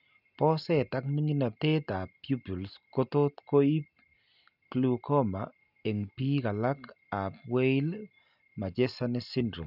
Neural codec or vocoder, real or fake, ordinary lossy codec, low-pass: none; real; none; 5.4 kHz